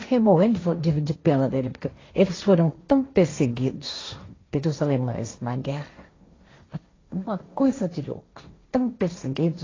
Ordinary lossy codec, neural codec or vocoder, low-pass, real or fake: AAC, 32 kbps; codec, 16 kHz, 1.1 kbps, Voila-Tokenizer; 7.2 kHz; fake